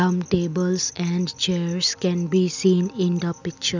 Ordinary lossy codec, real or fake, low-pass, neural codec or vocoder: none; real; 7.2 kHz; none